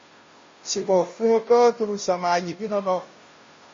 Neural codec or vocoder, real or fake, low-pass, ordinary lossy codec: codec, 16 kHz, 0.5 kbps, FunCodec, trained on Chinese and English, 25 frames a second; fake; 7.2 kHz; MP3, 32 kbps